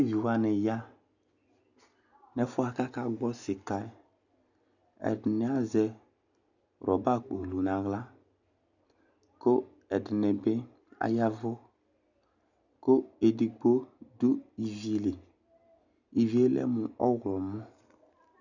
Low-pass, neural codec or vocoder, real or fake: 7.2 kHz; none; real